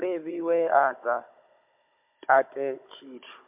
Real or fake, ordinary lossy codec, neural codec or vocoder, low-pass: fake; MP3, 32 kbps; codec, 16 kHz, 16 kbps, FunCodec, trained on Chinese and English, 50 frames a second; 3.6 kHz